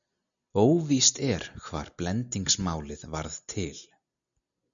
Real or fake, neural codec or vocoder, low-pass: real; none; 7.2 kHz